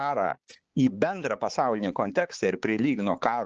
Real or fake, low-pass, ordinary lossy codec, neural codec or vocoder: fake; 7.2 kHz; Opus, 16 kbps; codec, 16 kHz, 4 kbps, X-Codec, HuBERT features, trained on balanced general audio